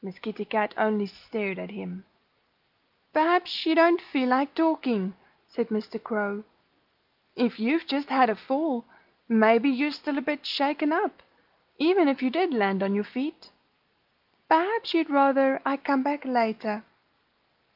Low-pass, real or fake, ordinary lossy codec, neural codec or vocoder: 5.4 kHz; real; Opus, 24 kbps; none